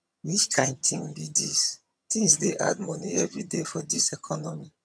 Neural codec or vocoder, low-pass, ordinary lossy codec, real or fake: vocoder, 22.05 kHz, 80 mel bands, HiFi-GAN; none; none; fake